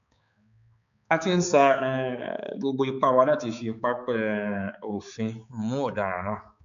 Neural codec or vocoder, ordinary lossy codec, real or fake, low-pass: codec, 16 kHz, 4 kbps, X-Codec, HuBERT features, trained on balanced general audio; none; fake; 7.2 kHz